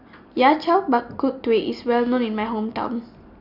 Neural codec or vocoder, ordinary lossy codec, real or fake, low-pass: none; none; real; 5.4 kHz